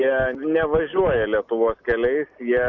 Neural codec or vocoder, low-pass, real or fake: none; 7.2 kHz; real